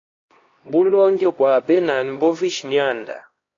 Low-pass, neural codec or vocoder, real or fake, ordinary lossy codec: 7.2 kHz; codec, 16 kHz, 1 kbps, X-Codec, HuBERT features, trained on LibriSpeech; fake; AAC, 32 kbps